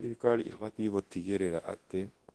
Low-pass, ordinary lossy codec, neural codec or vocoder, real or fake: 10.8 kHz; Opus, 16 kbps; codec, 24 kHz, 0.9 kbps, WavTokenizer, large speech release; fake